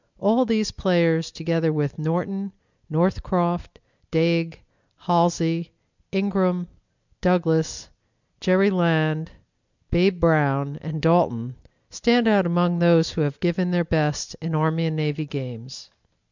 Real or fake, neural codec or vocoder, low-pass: real; none; 7.2 kHz